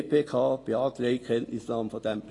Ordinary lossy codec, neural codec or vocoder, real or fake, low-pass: AAC, 32 kbps; none; real; 10.8 kHz